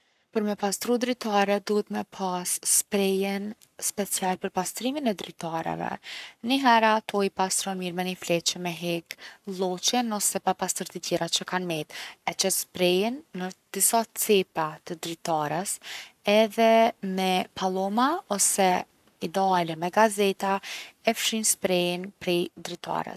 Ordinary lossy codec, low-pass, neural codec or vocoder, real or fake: none; 14.4 kHz; codec, 44.1 kHz, 7.8 kbps, Pupu-Codec; fake